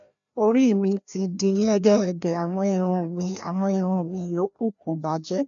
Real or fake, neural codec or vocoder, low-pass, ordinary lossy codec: fake; codec, 16 kHz, 1 kbps, FreqCodec, larger model; 7.2 kHz; none